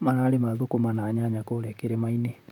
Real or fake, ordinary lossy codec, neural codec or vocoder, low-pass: fake; none; vocoder, 44.1 kHz, 128 mel bands, Pupu-Vocoder; 19.8 kHz